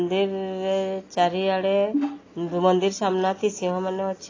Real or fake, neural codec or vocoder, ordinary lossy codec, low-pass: real; none; AAC, 32 kbps; 7.2 kHz